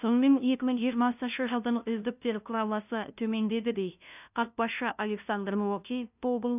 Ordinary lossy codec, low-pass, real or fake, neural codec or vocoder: none; 3.6 kHz; fake; codec, 16 kHz, 0.5 kbps, FunCodec, trained on LibriTTS, 25 frames a second